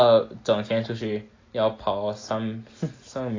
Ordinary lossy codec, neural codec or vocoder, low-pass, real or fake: AAC, 32 kbps; none; 7.2 kHz; real